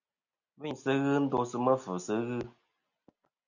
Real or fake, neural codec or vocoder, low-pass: real; none; 7.2 kHz